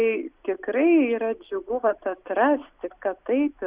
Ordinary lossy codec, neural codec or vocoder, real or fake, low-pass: Opus, 64 kbps; none; real; 3.6 kHz